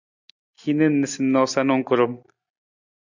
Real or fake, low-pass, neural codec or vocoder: real; 7.2 kHz; none